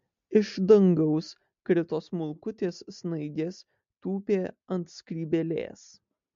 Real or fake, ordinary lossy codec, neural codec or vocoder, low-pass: real; MP3, 48 kbps; none; 7.2 kHz